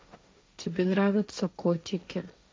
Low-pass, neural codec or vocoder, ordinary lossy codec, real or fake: none; codec, 16 kHz, 1.1 kbps, Voila-Tokenizer; none; fake